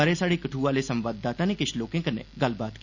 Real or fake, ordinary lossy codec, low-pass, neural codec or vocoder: real; Opus, 64 kbps; 7.2 kHz; none